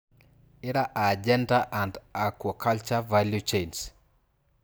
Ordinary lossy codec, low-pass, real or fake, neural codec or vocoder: none; none; real; none